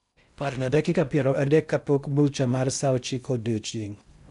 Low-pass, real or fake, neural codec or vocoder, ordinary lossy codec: 10.8 kHz; fake; codec, 16 kHz in and 24 kHz out, 0.6 kbps, FocalCodec, streaming, 2048 codes; none